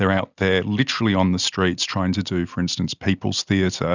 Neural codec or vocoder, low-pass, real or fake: none; 7.2 kHz; real